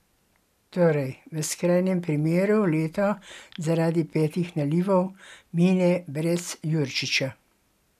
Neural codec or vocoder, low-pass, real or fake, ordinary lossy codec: none; 14.4 kHz; real; none